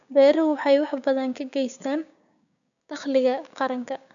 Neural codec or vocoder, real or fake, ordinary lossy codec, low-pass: codec, 16 kHz, 6 kbps, DAC; fake; none; 7.2 kHz